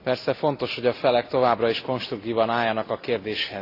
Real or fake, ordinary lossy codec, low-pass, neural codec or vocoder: real; AAC, 32 kbps; 5.4 kHz; none